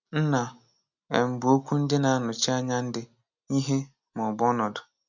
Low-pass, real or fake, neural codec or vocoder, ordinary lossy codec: 7.2 kHz; real; none; none